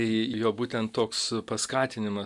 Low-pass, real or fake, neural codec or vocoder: 10.8 kHz; real; none